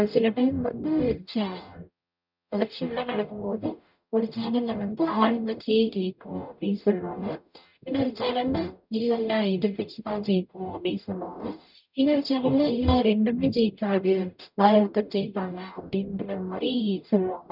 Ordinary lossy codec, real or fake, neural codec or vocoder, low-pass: none; fake; codec, 44.1 kHz, 0.9 kbps, DAC; 5.4 kHz